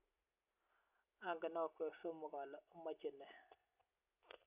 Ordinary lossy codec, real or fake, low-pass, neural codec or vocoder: none; real; 3.6 kHz; none